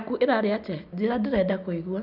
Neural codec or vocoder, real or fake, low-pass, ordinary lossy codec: none; real; 5.4 kHz; none